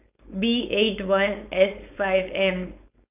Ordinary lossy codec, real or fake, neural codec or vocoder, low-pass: none; fake; codec, 16 kHz, 4.8 kbps, FACodec; 3.6 kHz